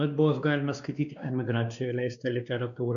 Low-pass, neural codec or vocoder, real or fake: 7.2 kHz; codec, 16 kHz, 2 kbps, X-Codec, WavLM features, trained on Multilingual LibriSpeech; fake